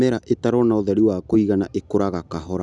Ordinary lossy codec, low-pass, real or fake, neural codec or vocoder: none; 10.8 kHz; real; none